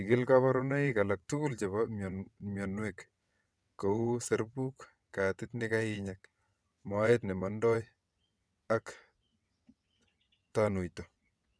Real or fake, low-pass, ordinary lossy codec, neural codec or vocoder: fake; none; none; vocoder, 22.05 kHz, 80 mel bands, WaveNeXt